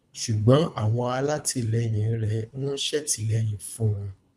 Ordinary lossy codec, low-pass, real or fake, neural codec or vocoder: none; none; fake; codec, 24 kHz, 3 kbps, HILCodec